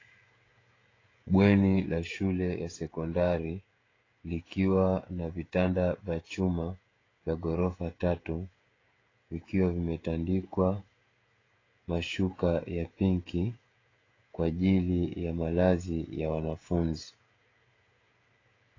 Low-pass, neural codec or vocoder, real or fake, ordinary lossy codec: 7.2 kHz; codec, 16 kHz, 16 kbps, FreqCodec, smaller model; fake; AAC, 32 kbps